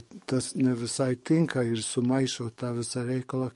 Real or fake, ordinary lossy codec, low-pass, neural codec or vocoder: fake; MP3, 48 kbps; 14.4 kHz; codec, 44.1 kHz, 7.8 kbps, DAC